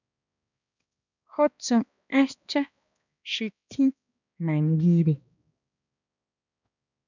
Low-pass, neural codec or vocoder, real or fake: 7.2 kHz; codec, 16 kHz, 1 kbps, X-Codec, HuBERT features, trained on balanced general audio; fake